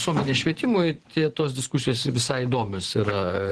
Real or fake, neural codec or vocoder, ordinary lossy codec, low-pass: fake; vocoder, 24 kHz, 100 mel bands, Vocos; Opus, 16 kbps; 10.8 kHz